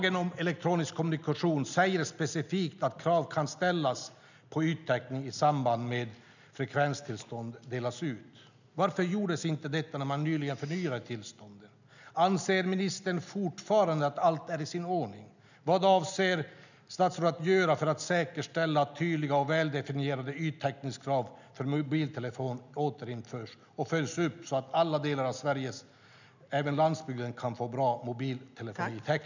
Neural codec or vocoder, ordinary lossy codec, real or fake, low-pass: none; none; real; 7.2 kHz